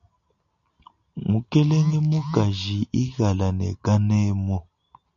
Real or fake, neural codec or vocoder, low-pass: real; none; 7.2 kHz